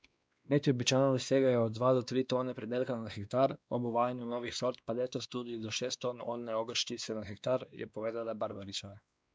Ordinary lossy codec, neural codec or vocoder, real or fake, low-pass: none; codec, 16 kHz, 2 kbps, X-Codec, WavLM features, trained on Multilingual LibriSpeech; fake; none